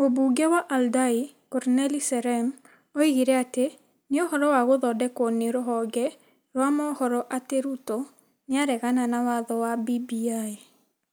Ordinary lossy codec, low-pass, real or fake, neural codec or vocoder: none; none; real; none